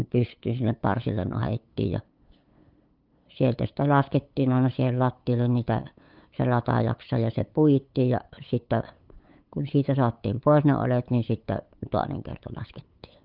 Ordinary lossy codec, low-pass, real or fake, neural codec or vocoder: Opus, 24 kbps; 5.4 kHz; fake; codec, 16 kHz, 8 kbps, FunCodec, trained on LibriTTS, 25 frames a second